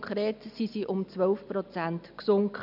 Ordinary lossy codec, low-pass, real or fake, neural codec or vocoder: none; 5.4 kHz; real; none